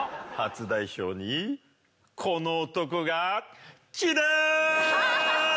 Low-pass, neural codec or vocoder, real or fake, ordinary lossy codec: none; none; real; none